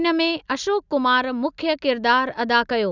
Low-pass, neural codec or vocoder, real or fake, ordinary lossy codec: 7.2 kHz; none; real; none